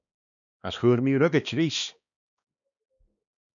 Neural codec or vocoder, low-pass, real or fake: codec, 16 kHz, 2 kbps, X-Codec, HuBERT features, trained on balanced general audio; 7.2 kHz; fake